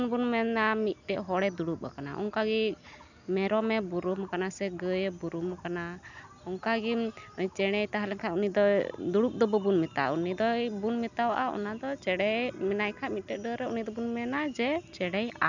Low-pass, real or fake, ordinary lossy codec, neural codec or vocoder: 7.2 kHz; real; none; none